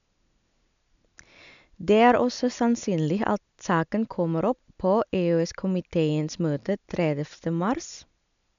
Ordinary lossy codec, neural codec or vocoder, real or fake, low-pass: MP3, 96 kbps; none; real; 7.2 kHz